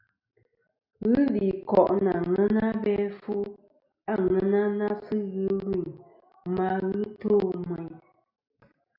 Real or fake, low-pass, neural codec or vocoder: real; 5.4 kHz; none